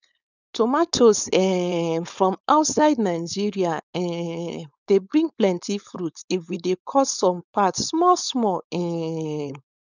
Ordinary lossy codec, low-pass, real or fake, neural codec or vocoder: none; 7.2 kHz; fake; codec, 16 kHz, 4.8 kbps, FACodec